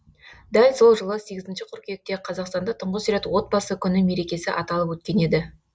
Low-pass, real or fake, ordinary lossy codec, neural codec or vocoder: none; real; none; none